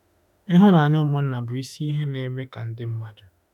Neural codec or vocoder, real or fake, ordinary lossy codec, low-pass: autoencoder, 48 kHz, 32 numbers a frame, DAC-VAE, trained on Japanese speech; fake; none; 19.8 kHz